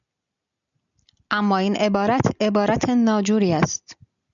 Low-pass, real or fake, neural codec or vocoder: 7.2 kHz; real; none